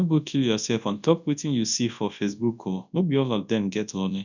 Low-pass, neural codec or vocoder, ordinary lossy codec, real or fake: 7.2 kHz; codec, 24 kHz, 0.9 kbps, WavTokenizer, large speech release; none; fake